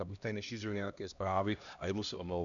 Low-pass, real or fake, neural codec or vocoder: 7.2 kHz; fake; codec, 16 kHz, 1 kbps, X-Codec, HuBERT features, trained on LibriSpeech